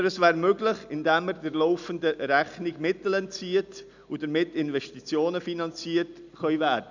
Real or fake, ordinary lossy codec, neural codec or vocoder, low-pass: real; none; none; 7.2 kHz